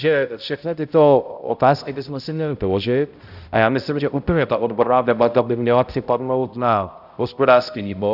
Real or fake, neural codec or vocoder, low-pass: fake; codec, 16 kHz, 0.5 kbps, X-Codec, HuBERT features, trained on balanced general audio; 5.4 kHz